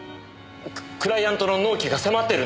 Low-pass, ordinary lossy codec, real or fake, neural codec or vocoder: none; none; real; none